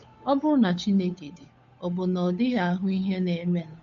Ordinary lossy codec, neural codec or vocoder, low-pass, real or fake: AAC, 64 kbps; codec, 16 kHz, 8 kbps, FunCodec, trained on Chinese and English, 25 frames a second; 7.2 kHz; fake